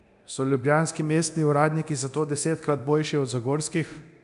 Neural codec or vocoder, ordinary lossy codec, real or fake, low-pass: codec, 24 kHz, 0.9 kbps, DualCodec; none; fake; 10.8 kHz